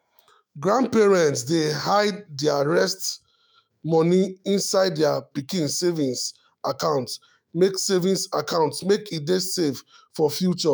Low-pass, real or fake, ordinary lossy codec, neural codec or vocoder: none; fake; none; autoencoder, 48 kHz, 128 numbers a frame, DAC-VAE, trained on Japanese speech